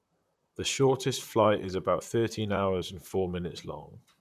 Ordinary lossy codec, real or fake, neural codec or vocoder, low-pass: none; fake; vocoder, 44.1 kHz, 128 mel bands, Pupu-Vocoder; 14.4 kHz